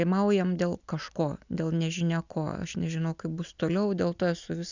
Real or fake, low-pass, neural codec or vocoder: real; 7.2 kHz; none